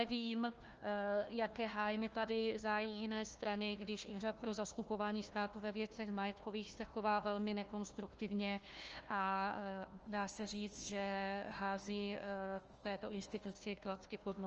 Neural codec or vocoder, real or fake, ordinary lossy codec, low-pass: codec, 16 kHz, 1 kbps, FunCodec, trained on Chinese and English, 50 frames a second; fake; Opus, 24 kbps; 7.2 kHz